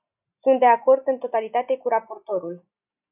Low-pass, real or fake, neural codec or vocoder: 3.6 kHz; real; none